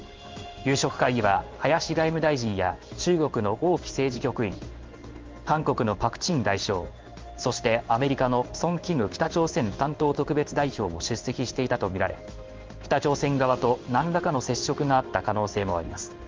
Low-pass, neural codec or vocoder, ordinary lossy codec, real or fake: 7.2 kHz; codec, 16 kHz in and 24 kHz out, 1 kbps, XY-Tokenizer; Opus, 32 kbps; fake